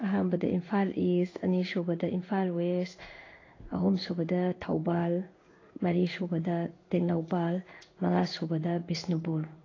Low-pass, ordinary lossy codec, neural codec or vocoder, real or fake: 7.2 kHz; AAC, 32 kbps; codec, 16 kHz in and 24 kHz out, 1 kbps, XY-Tokenizer; fake